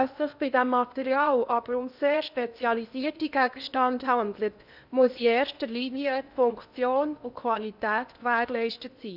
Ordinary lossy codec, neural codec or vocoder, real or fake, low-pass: none; codec, 16 kHz in and 24 kHz out, 0.8 kbps, FocalCodec, streaming, 65536 codes; fake; 5.4 kHz